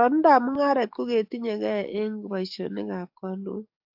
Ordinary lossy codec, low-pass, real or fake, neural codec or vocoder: AAC, 48 kbps; 5.4 kHz; fake; codec, 44.1 kHz, 7.8 kbps, DAC